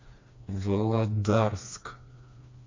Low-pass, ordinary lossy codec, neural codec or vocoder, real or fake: 7.2 kHz; MP3, 48 kbps; codec, 16 kHz, 2 kbps, FreqCodec, smaller model; fake